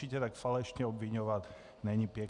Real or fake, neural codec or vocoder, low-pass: real; none; 10.8 kHz